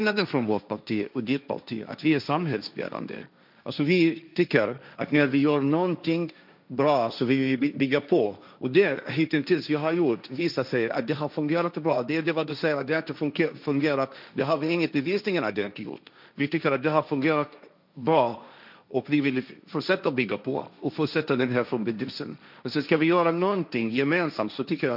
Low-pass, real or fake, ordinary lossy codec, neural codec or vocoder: 5.4 kHz; fake; none; codec, 16 kHz, 1.1 kbps, Voila-Tokenizer